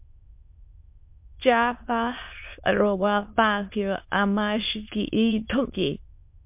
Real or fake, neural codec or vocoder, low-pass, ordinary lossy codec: fake; autoencoder, 22.05 kHz, a latent of 192 numbers a frame, VITS, trained on many speakers; 3.6 kHz; MP3, 32 kbps